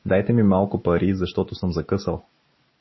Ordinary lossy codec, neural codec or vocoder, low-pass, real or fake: MP3, 24 kbps; none; 7.2 kHz; real